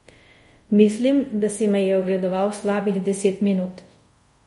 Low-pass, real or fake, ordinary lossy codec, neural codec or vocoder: 10.8 kHz; fake; MP3, 48 kbps; codec, 24 kHz, 0.5 kbps, DualCodec